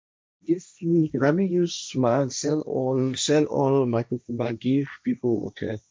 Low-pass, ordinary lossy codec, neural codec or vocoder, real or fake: none; none; codec, 16 kHz, 1.1 kbps, Voila-Tokenizer; fake